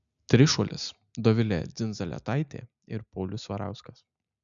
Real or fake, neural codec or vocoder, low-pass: real; none; 7.2 kHz